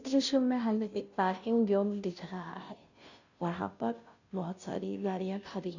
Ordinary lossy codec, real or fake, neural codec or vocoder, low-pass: none; fake; codec, 16 kHz, 0.5 kbps, FunCodec, trained on Chinese and English, 25 frames a second; 7.2 kHz